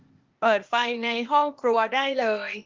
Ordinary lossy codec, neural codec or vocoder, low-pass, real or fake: Opus, 32 kbps; codec, 16 kHz, 0.8 kbps, ZipCodec; 7.2 kHz; fake